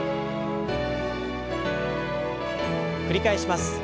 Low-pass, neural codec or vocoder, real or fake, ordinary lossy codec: none; none; real; none